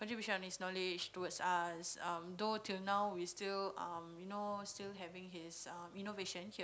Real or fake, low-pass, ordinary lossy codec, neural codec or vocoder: real; none; none; none